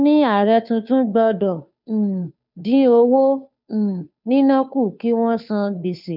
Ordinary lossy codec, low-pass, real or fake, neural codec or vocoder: none; 5.4 kHz; fake; codec, 16 kHz, 2 kbps, FunCodec, trained on Chinese and English, 25 frames a second